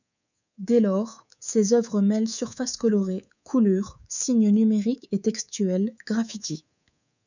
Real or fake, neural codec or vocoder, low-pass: fake; codec, 24 kHz, 3.1 kbps, DualCodec; 7.2 kHz